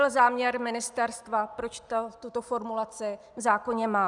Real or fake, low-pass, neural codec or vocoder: real; 10.8 kHz; none